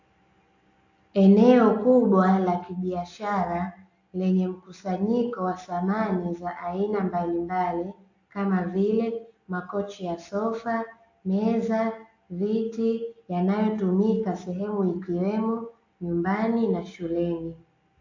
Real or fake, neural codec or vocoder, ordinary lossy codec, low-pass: real; none; AAC, 48 kbps; 7.2 kHz